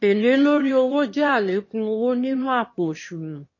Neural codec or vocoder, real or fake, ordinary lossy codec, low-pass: autoencoder, 22.05 kHz, a latent of 192 numbers a frame, VITS, trained on one speaker; fake; MP3, 32 kbps; 7.2 kHz